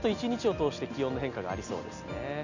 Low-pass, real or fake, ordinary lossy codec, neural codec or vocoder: 7.2 kHz; real; none; none